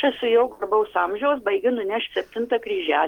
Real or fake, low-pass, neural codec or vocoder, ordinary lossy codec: real; 19.8 kHz; none; Opus, 32 kbps